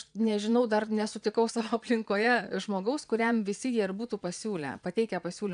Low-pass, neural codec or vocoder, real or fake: 9.9 kHz; vocoder, 22.05 kHz, 80 mel bands, Vocos; fake